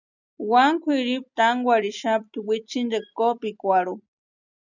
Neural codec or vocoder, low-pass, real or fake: none; 7.2 kHz; real